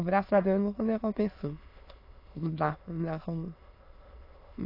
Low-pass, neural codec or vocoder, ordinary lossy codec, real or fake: 5.4 kHz; autoencoder, 22.05 kHz, a latent of 192 numbers a frame, VITS, trained on many speakers; AAC, 32 kbps; fake